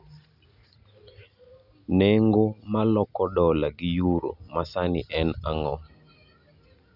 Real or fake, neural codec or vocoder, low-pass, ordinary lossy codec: real; none; 5.4 kHz; none